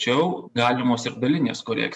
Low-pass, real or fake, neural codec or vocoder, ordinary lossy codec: 10.8 kHz; real; none; MP3, 64 kbps